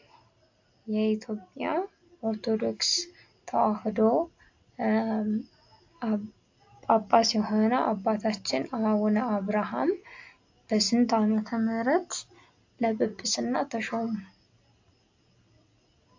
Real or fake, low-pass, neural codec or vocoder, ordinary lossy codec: real; 7.2 kHz; none; AAC, 48 kbps